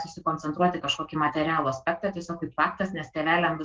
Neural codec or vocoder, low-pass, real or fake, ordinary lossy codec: none; 7.2 kHz; real; Opus, 16 kbps